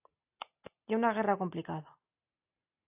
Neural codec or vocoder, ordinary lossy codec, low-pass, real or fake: none; AAC, 32 kbps; 3.6 kHz; real